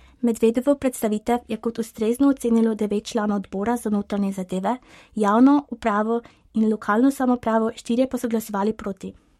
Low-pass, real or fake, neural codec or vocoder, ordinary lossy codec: 19.8 kHz; fake; codec, 44.1 kHz, 7.8 kbps, Pupu-Codec; MP3, 64 kbps